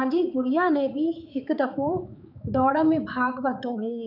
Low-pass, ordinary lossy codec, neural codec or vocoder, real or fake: 5.4 kHz; none; codec, 16 kHz, 4 kbps, X-Codec, HuBERT features, trained on balanced general audio; fake